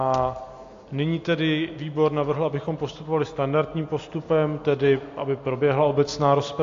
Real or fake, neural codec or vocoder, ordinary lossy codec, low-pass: real; none; AAC, 48 kbps; 7.2 kHz